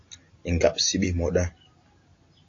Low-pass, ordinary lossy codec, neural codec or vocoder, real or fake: 7.2 kHz; AAC, 48 kbps; none; real